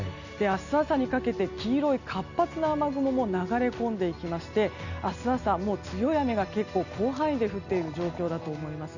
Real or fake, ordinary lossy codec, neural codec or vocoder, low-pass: real; none; none; 7.2 kHz